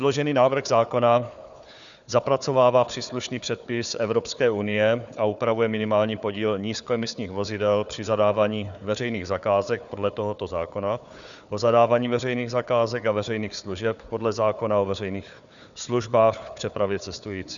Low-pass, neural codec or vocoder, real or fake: 7.2 kHz; codec, 16 kHz, 4 kbps, FunCodec, trained on Chinese and English, 50 frames a second; fake